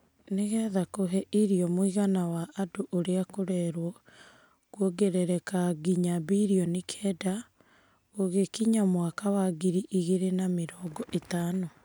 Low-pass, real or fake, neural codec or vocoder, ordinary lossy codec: none; real; none; none